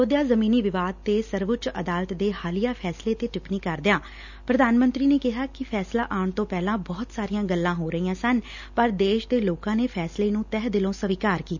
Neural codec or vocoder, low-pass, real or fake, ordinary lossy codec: none; 7.2 kHz; real; none